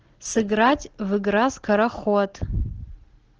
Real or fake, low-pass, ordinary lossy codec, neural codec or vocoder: real; 7.2 kHz; Opus, 16 kbps; none